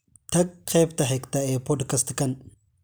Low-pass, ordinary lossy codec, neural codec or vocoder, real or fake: none; none; none; real